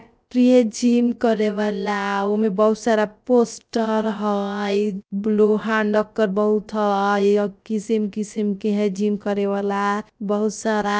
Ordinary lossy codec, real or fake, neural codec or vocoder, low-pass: none; fake; codec, 16 kHz, 0.3 kbps, FocalCodec; none